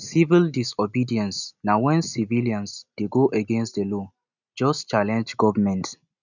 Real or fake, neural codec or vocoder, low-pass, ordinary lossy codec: real; none; 7.2 kHz; none